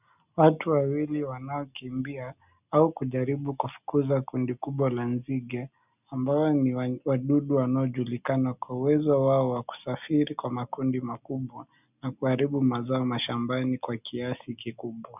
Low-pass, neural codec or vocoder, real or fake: 3.6 kHz; none; real